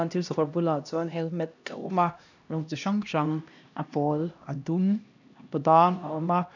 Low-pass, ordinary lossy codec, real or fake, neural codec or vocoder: 7.2 kHz; none; fake; codec, 16 kHz, 1 kbps, X-Codec, HuBERT features, trained on LibriSpeech